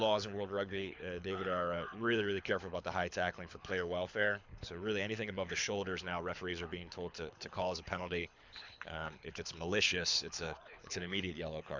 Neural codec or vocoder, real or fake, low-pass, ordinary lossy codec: codec, 24 kHz, 6 kbps, HILCodec; fake; 7.2 kHz; MP3, 64 kbps